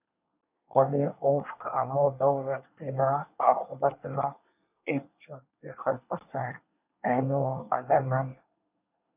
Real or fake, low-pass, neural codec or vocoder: fake; 3.6 kHz; codec, 24 kHz, 1 kbps, SNAC